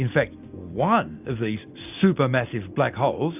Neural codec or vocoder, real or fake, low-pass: none; real; 3.6 kHz